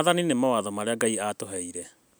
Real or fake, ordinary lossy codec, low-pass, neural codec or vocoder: real; none; none; none